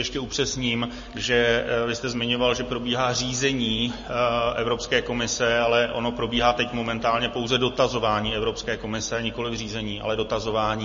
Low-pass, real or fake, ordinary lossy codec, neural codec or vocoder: 7.2 kHz; real; MP3, 32 kbps; none